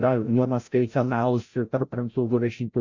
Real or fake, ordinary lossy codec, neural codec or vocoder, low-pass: fake; Opus, 64 kbps; codec, 16 kHz, 0.5 kbps, FreqCodec, larger model; 7.2 kHz